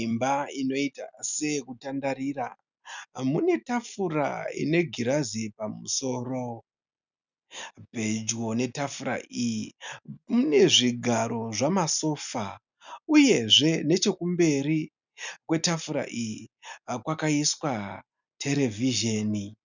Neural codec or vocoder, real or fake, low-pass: none; real; 7.2 kHz